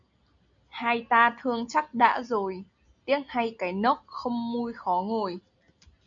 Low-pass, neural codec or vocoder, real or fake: 7.2 kHz; none; real